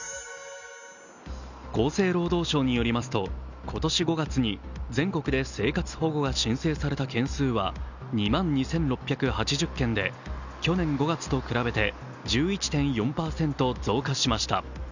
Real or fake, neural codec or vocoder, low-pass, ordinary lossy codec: real; none; 7.2 kHz; none